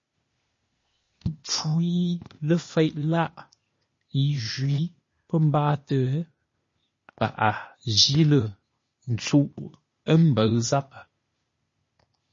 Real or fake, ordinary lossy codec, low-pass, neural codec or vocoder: fake; MP3, 32 kbps; 7.2 kHz; codec, 16 kHz, 0.8 kbps, ZipCodec